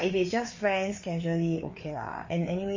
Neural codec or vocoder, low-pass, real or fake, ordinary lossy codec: codec, 16 kHz, 4 kbps, FreqCodec, larger model; 7.2 kHz; fake; MP3, 32 kbps